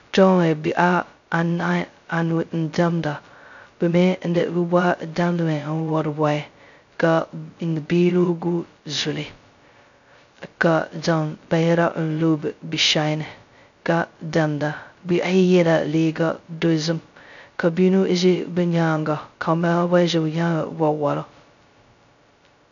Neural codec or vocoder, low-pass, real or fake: codec, 16 kHz, 0.2 kbps, FocalCodec; 7.2 kHz; fake